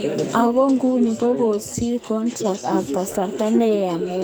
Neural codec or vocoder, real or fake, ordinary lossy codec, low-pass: codec, 44.1 kHz, 2.6 kbps, SNAC; fake; none; none